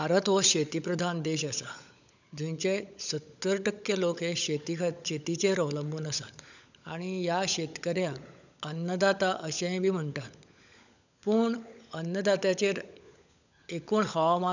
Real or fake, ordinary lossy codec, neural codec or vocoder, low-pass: fake; none; codec, 16 kHz, 16 kbps, FunCodec, trained on LibriTTS, 50 frames a second; 7.2 kHz